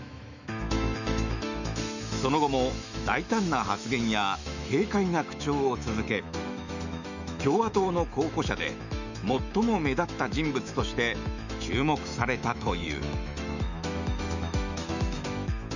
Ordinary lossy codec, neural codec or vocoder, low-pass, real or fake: none; autoencoder, 48 kHz, 128 numbers a frame, DAC-VAE, trained on Japanese speech; 7.2 kHz; fake